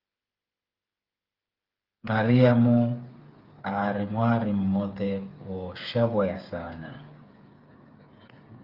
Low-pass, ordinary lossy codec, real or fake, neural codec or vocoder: 5.4 kHz; Opus, 32 kbps; fake; codec, 16 kHz, 8 kbps, FreqCodec, smaller model